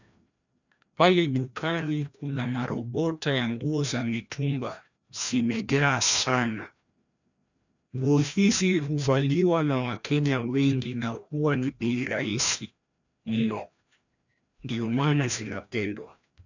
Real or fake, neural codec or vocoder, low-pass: fake; codec, 16 kHz, 1 kbps, FreqCodec, larger model; 7.2 kHz